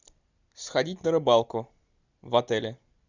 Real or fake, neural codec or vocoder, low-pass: real; none; 7.2 kHz